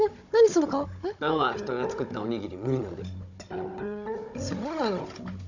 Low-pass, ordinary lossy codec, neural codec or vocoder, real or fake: 7.2 kHz; none; codec, 16 kHz, 16 kbps, FunCodec, trained on Chinese and English, 50 frames a second; fake